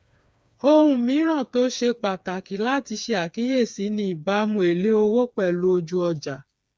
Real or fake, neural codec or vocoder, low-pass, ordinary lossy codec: fake; codec, 16 kHz, 4 kbps, FreqCodec, smaller model; none; none